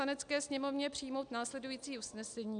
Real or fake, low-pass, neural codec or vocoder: real; 9.9 kHz; none